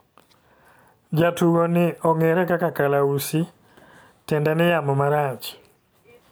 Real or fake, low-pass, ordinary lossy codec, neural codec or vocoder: real; none; none; none